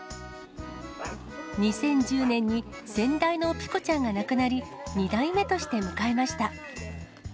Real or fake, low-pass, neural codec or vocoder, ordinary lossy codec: real; none; none; none